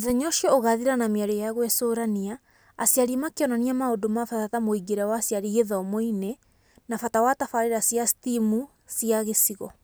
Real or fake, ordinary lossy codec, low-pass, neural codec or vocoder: real; none; none; none